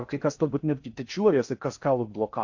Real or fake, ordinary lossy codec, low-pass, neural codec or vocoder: fake; AAC, 48 kbps; 7.2 kHz; codec, 16 kHz in and 24 kHz out, 0.6 kbps, FocalCodec, streaming, 4096 codes